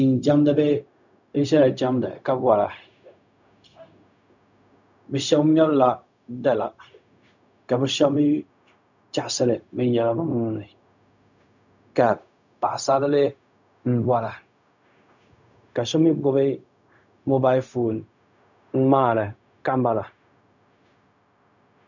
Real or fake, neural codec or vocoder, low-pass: fake; codec, 16 kHz, 0.4 kbps, LongCat-Audio-Codec; 7.2 kHz